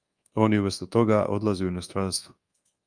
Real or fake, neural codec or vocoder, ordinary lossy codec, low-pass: fake; codec, 24 kHz, 1.2 kbps, DualCodec; Opus, 32 kbps; 9.9 kHz